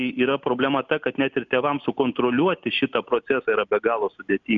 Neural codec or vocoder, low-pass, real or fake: none; 7.2 kHz; real